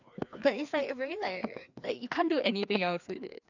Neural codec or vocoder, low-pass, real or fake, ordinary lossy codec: codec, 16 kHz, 2 kbps, FreqCodec, larger model; 7.2 kHz; fake; none